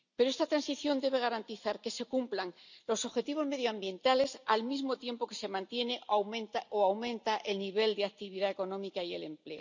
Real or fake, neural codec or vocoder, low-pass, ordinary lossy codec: real; none; 7.2 kHz; none